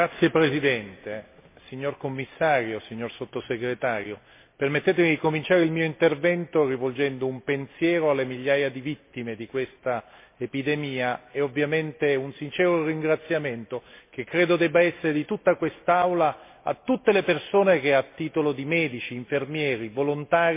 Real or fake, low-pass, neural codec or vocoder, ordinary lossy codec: real; 3.6 kHz; none; MP3, 24 kbps